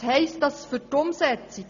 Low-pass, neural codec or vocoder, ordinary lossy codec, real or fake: 7.2 kHz; none; none; real